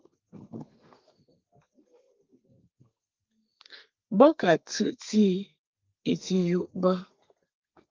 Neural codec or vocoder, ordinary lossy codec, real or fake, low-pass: codec, 32 kHz, 1.9 kbps, SNAC; Opus, 32 kbps; fake; 7.2 kHz